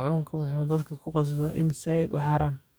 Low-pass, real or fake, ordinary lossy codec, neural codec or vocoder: none; fake; none; codec, 44.1 kHz, 2.6 kbps, DAC